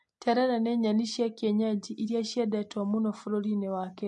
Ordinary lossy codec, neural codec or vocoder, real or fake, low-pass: MP3, 48 kbps; none; real; 10.8 kHz